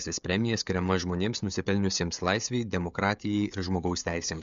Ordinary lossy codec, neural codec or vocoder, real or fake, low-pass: MP3, 64 kbps; codec, 16 kHz, 16 kbps, FreqCodec, smaller model; fake; 7.2 kHz